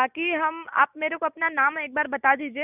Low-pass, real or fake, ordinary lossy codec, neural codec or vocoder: 3.6 kHz; real; none; none